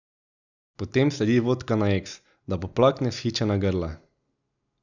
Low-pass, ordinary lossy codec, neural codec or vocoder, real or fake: 7.2 kHz; none; none; real